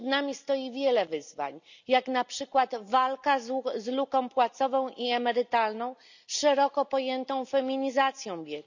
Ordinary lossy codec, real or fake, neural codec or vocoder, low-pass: none; real; none; 7.2 kHz